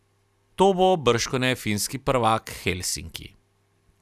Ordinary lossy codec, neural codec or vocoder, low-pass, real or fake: none; none; 14.4 kHz; real